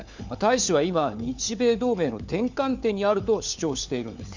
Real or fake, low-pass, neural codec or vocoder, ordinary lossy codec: fake; 7.2 kHz; codec, 16 kHz, 4 kbps, FunCodec, trained on LibriTTS, 50 frames a second; none